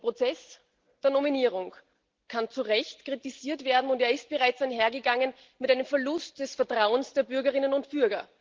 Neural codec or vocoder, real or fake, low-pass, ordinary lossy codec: none; real; 7.2 kHz; Opus, 16 kbps